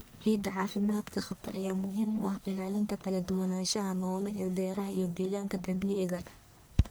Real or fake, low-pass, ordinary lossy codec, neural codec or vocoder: fake; none; none; codec, 44.1 kHz, 1.7 kbps, Pupu-Codec